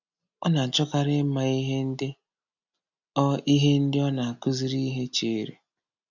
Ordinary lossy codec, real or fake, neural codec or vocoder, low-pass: none; real; none; 7.2 kHz